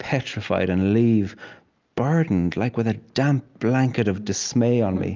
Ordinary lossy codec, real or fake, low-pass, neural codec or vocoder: Opus, 24 kbps; real; 7.2 kHz; none